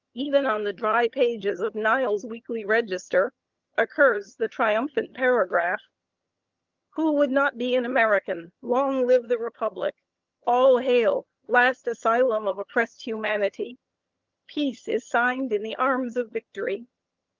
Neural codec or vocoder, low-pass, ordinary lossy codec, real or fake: vocoder, 22.05 kHz, 80 mel bands, HiFi-GAN; 7.2 kHz; Opus, 24 kbps; fake